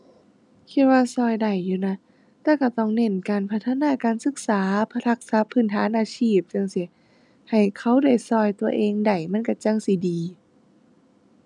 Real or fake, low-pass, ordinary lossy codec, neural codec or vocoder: real; 10.8 kHz; none; none